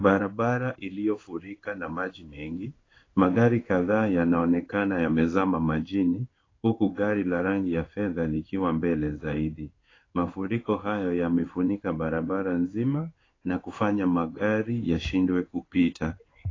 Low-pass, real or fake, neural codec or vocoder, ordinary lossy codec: 7.2 kHz; fake; codec, 16 kHz in and 24 kHz out, 1 kbps, XY-Tokenizer; AAC, 32 kbps